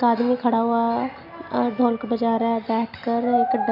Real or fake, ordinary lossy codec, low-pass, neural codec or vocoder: real; none; 5.4 kHz; none